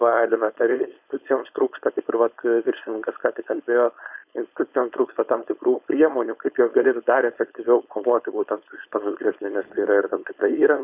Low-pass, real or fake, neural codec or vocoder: 3.6 kHz; fake; codec, 16 kHz, 4.8 kbps, FACodec